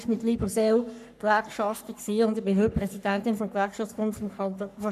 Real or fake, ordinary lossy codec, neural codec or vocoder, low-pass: fake; none; codec, 44.1 kHz, 3.4 kbps, Pupu-Codec; 14.4 kHz